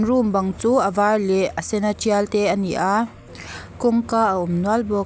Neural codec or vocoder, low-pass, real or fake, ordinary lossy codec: none; none; real; none